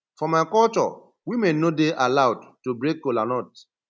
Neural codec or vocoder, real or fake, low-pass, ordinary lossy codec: none; real; none; none